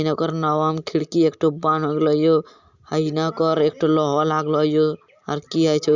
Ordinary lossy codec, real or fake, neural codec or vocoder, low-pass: Opus, 64 kbps; real; none; 7.2 kHz